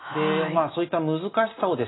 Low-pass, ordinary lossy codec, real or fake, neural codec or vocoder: 7.2 kHz; AAC, 16 kbps; real; none